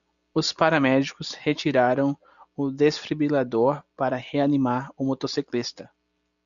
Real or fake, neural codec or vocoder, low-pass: real; none; 7.2 kHz